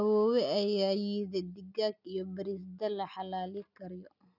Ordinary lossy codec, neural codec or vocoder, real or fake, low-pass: none; none; real; 5.4 kHz